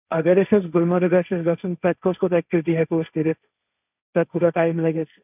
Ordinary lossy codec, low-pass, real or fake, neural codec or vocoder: none; 3.6 kHz; fake; codec, 16 kHz, 1.1 kbps, Voila-Tokenizer